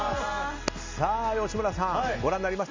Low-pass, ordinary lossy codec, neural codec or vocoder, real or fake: 7.2 kHz; MP3, 64 kbps; none; real